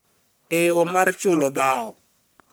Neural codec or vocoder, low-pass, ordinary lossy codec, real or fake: codec, 44.1 kHz, 1.7 kbps, Pupu-Codec; none; none; fake